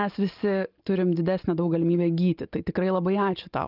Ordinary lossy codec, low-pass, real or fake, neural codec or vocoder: Opus, 32 kbps; 5.4 kHz; real; none